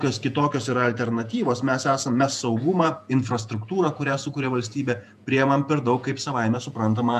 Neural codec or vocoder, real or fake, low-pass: vocoder, 48 kHz, 128 mel bands, Vocos; fake; 14.4 kHz